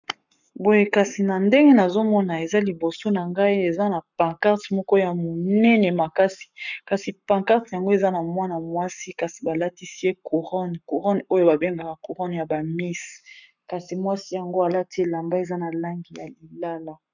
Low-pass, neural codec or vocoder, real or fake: 7.2 kHz; codec, 44.1 kHz, 7.8 kbps, Pupu-Codec; fake